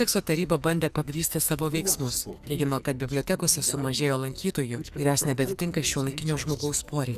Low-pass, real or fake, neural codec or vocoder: 14.4 kHz; fake; codec, 44.1 kHz, 2.6 kbps, SNAC